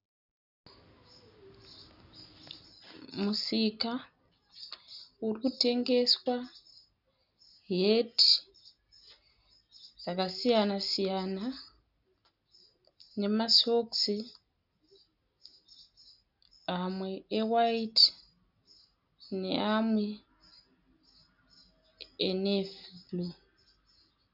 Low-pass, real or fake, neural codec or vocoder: 5.4 kHz; real; none